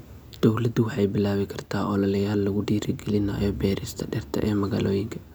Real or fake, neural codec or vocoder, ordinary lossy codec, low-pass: real; none; none; none